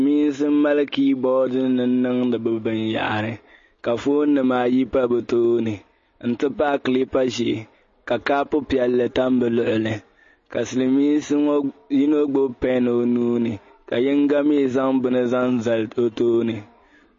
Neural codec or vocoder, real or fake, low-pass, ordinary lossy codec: none; real; 7.2 kHz; MP3, 32 kbps